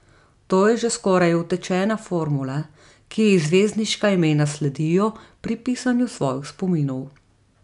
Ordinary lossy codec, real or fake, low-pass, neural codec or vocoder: none; real; 10.8 kHz; none